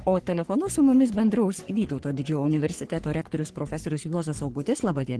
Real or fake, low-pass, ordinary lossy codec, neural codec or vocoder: fake; 10.8 kHz; Opus, 16 kbps; codec, 44.1 kHz, 3.4 kbps, Pupu-Codec